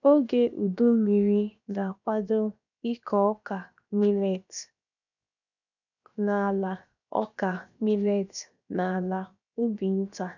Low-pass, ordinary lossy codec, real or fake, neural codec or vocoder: 7.2 kHz; none; fake; codec, 16 kHz, 0.7 kbps, FocalCodec